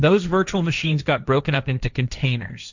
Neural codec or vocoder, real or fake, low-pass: codec, 16 kHz, 1.1 kbps, Voila-Tokenizer; fake; 7.2 kHz